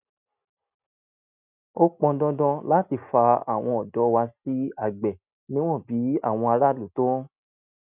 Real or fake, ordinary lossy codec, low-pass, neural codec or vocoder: real; none; 3.6 kHz; none